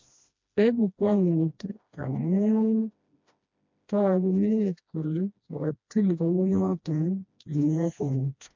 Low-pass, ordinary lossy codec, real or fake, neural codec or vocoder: 7.2 kHz; MP3, 48 kbps; fake; codec, 16 kHz, 1 kbps, FreqCodec, smaller model